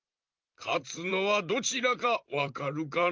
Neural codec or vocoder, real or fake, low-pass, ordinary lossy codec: vocoder, 44.1 kHz, 128 mel bands, Pupu-Vocoder; fake; 7.2 kHz; Opus, 24 kbps